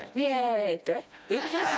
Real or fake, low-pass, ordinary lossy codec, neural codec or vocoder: fake; none; none; codec, 16 kHz, 1 kbps, FreqCodec, smaller model